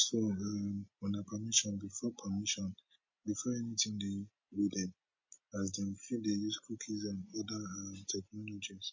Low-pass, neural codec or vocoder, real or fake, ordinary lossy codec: 7.2 kHz; none; real; MP3, 32 kbps